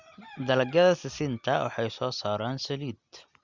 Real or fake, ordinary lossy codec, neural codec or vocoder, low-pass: real; Opus, 64 kbps; none; 7.2 kHz